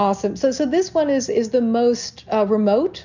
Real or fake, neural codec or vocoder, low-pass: real; none; 7.2 kHz